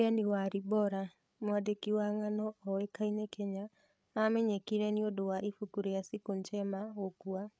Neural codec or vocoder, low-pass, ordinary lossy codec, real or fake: codec, 16 kHz, 8 kbps, FreqCodec, larger model; none; none; fake